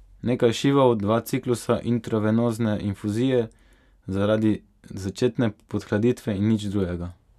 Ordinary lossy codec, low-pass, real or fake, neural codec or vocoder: none; 14.4 kHz; real; none